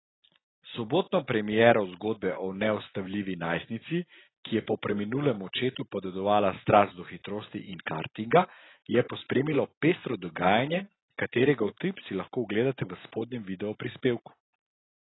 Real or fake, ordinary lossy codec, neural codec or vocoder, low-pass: real; AAC, 16 kbps; none; 7.2 kHz